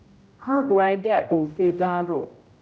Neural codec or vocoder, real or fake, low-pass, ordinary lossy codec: codec, 16 kHz, 0.5 kbps, X-Codec, HuBERT features, trained on general audio; fake; none; none